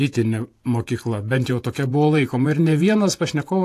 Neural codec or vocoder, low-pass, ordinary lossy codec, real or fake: vocoder, 48 kHz, 128 mel bands, Vocos; 14.4 kHz; AAC, 64 kbps; fake